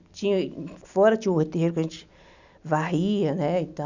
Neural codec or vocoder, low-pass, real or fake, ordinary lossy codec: none; 7.2 kHz; real; none